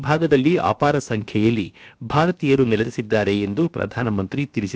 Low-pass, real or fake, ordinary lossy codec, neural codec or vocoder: none; fake; none; codec, 16 kHz, about 1 kbps, DyCAST, with the encoder's durations